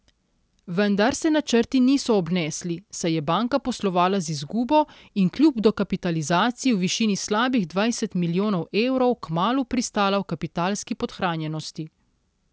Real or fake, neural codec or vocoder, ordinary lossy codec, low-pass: real; none; none; none